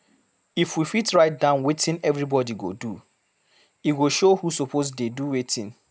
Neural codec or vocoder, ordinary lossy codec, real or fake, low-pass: none; none; real; none